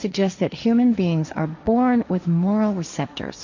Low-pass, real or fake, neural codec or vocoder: 7.2 kHz; fake; codec, 16 kHz, 1.1 kbps, Voila-Tokenizer